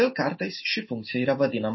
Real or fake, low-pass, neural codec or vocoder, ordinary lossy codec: real; 7.2 kHz; none; MP3, 24 kbps